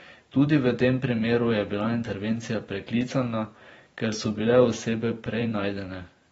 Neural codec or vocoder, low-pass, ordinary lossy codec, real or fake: vocoder, 44.1 kHz, 128 mel bands every 512 samples, BigVGAN v2; 19.8 kHz; AAC, 24 kbps; fake